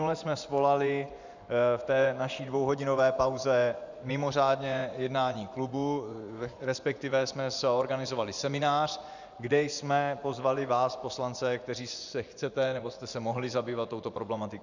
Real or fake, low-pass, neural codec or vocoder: fake; 7.2 kHz; vocoder, 24 kHz, 100 mel bands, Vocos